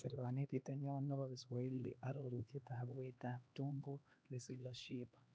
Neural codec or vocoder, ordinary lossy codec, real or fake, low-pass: codec, 16 kHz, 2 kbps, X-Codec, HuBERT features, trained on LibriSpeech; none; fake; none